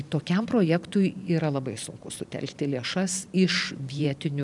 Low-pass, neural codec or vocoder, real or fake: 10.8 kHz; vocoder, 44.1 kHz, 128 mel bands every 512 samples, BigVGAN v2; fake